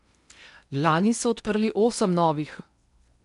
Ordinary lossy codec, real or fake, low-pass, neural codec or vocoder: MP3, 96 kbps; fake; 10.8 kHz; codec, 16 kHz in and 24 kHz out, 0.8 kbps, FocalCodec, streaming, 65536 codes